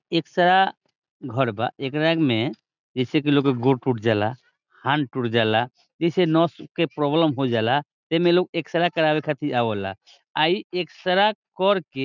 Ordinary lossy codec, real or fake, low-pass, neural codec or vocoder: none; real; 7.2 kHz; none